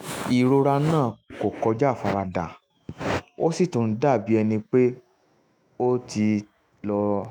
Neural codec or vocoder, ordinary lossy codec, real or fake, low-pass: autoencoder, 48 kHz, 128 numbers a frame, DAC-VAE, trained on Japanese speech; none; fake; none